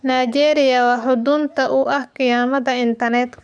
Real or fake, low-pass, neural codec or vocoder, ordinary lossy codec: fake; 9.9 kHz; codec, 44.1 kHz, 7.8 kbps, DAC; none